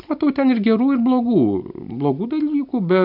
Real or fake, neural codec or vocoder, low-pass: real; none; 5.4 kHz